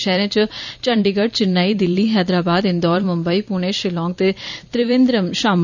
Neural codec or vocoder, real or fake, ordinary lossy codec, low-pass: vocoder, 44.1 kHz, 128 mel bands every 256 samples, BigVGAN v2; fake; none; 7.2 kHz